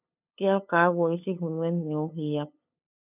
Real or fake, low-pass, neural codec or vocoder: fake; 3.6 kHz; codec, 16 kHz, 8 kbps, FunCodec, trained on LibriTTS, 25 frames a second